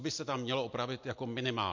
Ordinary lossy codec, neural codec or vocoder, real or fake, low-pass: MP3, 48 kbps; none; real; 7.2 kHz